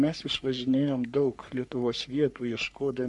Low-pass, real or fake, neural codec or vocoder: 10.8 kHz; fake; codec, 44.1 kHz, 3.4 kbps, Pupu-Codec